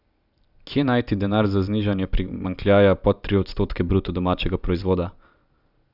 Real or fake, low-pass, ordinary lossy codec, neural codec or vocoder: real; 5.4 kHz; none; none